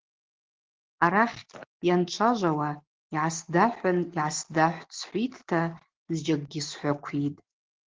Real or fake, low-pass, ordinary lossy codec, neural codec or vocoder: real; 7.2 kHz; Opus, 16 kbps; none